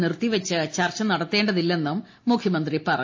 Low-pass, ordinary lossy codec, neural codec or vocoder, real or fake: 7.2 kHz; MP3, 32 kbps; none; real